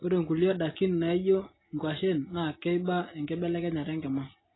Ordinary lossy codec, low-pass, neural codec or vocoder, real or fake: AAC, 16 kbps; 7.2 kHz; none; real